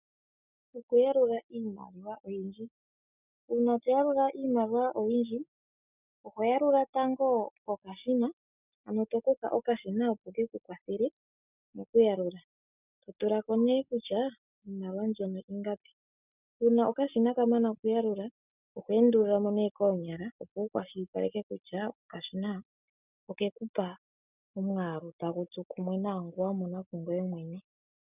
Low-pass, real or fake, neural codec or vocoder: 3.6 kHz; real; none